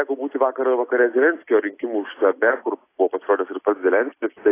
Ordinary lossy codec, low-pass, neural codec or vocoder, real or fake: AAC, 24 kbps; 3.6 kHz; none; real